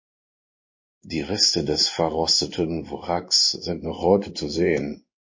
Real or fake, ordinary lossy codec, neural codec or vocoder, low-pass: fake; MP3, 32 kbps; codec, 16 kHz in and 24 kHz out, 1 kbps, XY-Tokenizer; 7.2 kHz